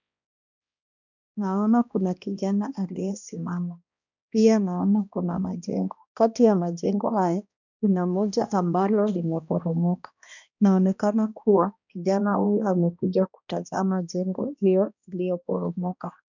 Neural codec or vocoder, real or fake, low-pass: codec, 16 kHz, 1 kbps, X-Codec, HuBERT features, trained on balanced general audio; fake; 7.2 kHz